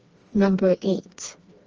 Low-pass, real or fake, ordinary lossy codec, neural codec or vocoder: 7.2 kHz; fake; Opus, 24 kbps; codec, 16 kHz, 2 kbps, FreqCodec, smaller model